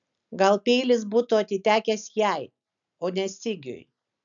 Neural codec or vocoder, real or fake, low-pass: none; real; 7.2 kHz